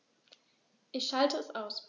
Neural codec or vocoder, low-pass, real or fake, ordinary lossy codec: none; 7.2 kHz; real; none